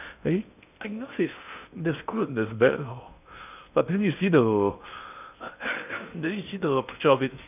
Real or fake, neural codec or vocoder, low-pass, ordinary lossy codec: fake; codec, 16 kHz in and 24 kHz out, 0.6 kbps, FocalCodec, streaming, 2048 codes; 3.6 kHz; none